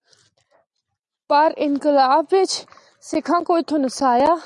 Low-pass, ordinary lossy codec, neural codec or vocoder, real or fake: 10.8 kHz; Opus, 64 kbps; none; real